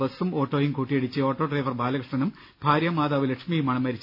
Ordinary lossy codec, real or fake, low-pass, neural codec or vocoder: none; real; 5.4 kHz; none